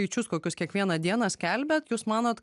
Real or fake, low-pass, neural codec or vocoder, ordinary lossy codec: real; 10.8 kHz; none; AAC, 96 kbps